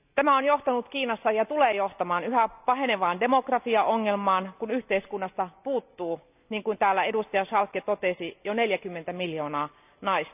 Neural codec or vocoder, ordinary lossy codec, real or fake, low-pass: none; none; real; 3.6 kHz